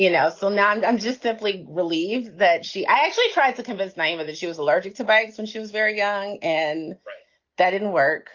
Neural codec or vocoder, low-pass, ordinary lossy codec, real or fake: none; 7.2 kHz; Opus, 32 kbps; real